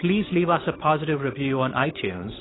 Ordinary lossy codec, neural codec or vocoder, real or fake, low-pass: AAC, 16 kbps; codec, 16 kHz, 8 kbps, FunCodec, trained on Chinese and English, 25 frames a second; fake; 7.2 kHz